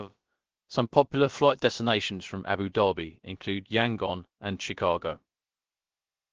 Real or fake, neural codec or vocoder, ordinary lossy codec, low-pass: fake; codec, 16 kHz, about 1 kbps, DyCAST, with the encoder's durations; Opus, 16 kbps; 7.2 kHz